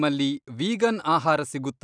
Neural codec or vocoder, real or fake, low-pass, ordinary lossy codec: none; real; 9.9 kHz; none